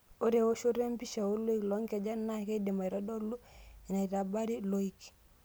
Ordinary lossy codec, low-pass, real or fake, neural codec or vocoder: none; none; real; none